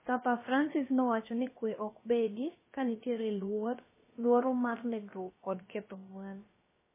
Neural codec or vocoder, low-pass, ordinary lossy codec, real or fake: codec, 16 kHz, about 1 kbps, DyCAST, with the encoder's durations; 3.6 kHz; MP3, 16 kbps; fake